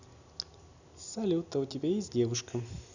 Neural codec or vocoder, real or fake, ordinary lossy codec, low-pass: none; real; none; 7.2 kHz